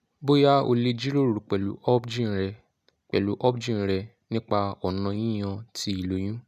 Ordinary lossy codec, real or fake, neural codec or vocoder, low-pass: none; real; none; 14.4 kHz